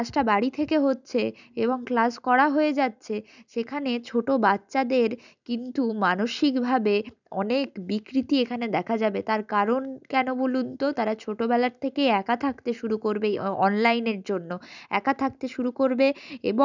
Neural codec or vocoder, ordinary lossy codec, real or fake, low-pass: none; none; real; 7.2 kHz